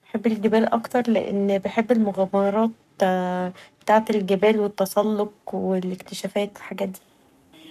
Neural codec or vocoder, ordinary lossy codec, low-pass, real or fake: codec, 44.1 kHz, 7.8 kbps, Pupu-Codec; none; 14.4 kHz; fake